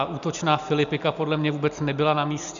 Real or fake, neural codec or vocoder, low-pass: real; none; 7.2 kHz